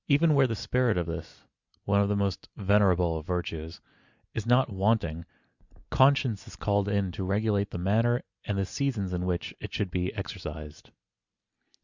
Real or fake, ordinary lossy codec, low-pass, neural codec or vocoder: real; Opus, 64 kbps; 7.2 kHz; none